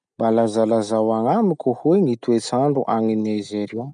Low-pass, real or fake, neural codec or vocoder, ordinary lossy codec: 9.9 kHz; real; none; none